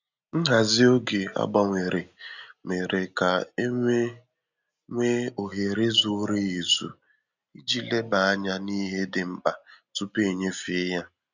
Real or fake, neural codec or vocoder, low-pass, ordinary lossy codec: real; none; 7.2 kHz; none